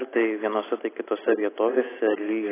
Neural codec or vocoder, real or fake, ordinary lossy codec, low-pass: none; real; AAC, 16 kbps; 3.6 kHz